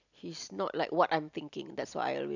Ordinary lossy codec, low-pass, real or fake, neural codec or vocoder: none; 7.2 kHz; real; none